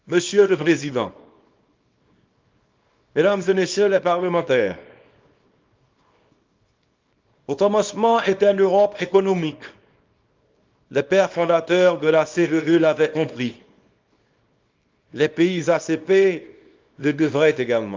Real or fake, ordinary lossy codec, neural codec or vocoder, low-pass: fake; Opus, 24 kbps; codec, 24 kHz, 0.9 kbps, WavTokenizer, small release; 7.2 kHz